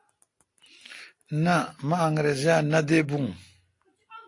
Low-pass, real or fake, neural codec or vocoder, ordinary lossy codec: 10.8 kHz; real; none; AAC, 48 kbps